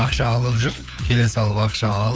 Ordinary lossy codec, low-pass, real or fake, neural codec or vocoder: none; none; fake; codec, 16 kHz, 4 kbps, FunCodec, trained on LibriTTS, 50 frames a second